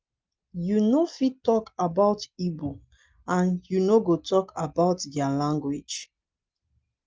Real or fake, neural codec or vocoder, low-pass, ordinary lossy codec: real; none; 7.2 kHz; Opus, 24 kbps